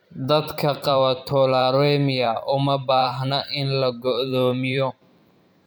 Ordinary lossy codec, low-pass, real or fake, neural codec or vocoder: none; none; fake; vocoder, 44.1 kHz, 128 mel bands every 512 samples, BigVGAN v2